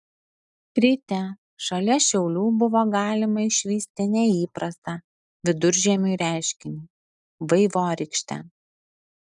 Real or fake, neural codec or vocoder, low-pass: real; none; 10.8 kHz